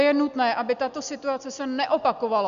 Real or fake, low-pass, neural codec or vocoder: real; 7.2 kHz; none